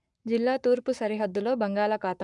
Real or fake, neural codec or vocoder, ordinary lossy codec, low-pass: fake; codec, 44.1 kHz, 7.8 kbps, Pupu-Codec; none; 10.8 kHz